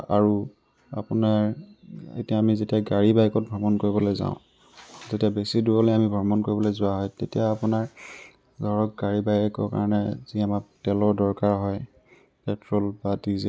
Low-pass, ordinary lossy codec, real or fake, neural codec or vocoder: none; none; real; none